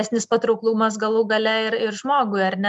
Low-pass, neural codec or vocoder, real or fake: 10.8 kHz; none; real